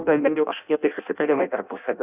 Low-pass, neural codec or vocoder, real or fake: 3.6 kHz; codec, 16 kHz in and 24 kHz out, 0.6 kbps, FireRedTTS-2 codec; fake